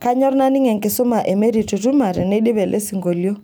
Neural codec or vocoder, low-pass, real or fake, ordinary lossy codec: none; none; real; none